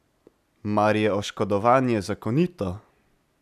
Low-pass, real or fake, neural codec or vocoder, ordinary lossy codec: 14.4 kHz; real; none; none